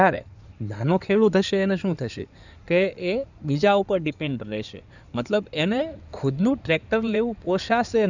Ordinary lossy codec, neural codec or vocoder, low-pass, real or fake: none; codec, 16 kHz in and 24 kHz out, 2.2 kbps, FireRedTTS-2 codec; 7.2 kHz; fake